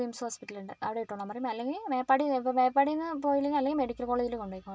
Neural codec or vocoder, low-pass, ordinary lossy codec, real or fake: none; none; none; real